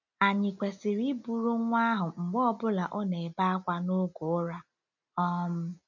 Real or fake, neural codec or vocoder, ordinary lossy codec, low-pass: real; none; none; 7.2 kHz